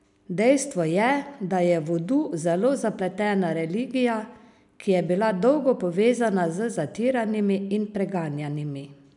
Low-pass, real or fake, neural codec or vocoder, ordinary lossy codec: 10.8 kHz; real; none; none